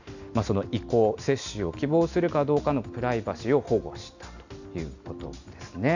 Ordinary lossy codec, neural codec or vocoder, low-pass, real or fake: none; none; 7.2 kHz; real